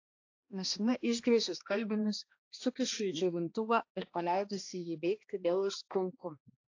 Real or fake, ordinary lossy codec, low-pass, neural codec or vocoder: fake; AAC, 48 kbps; 7.2 kHz; codec, 16 kHz, 1 kbps, X-Codec, HuBERT features, trained on balanced general audio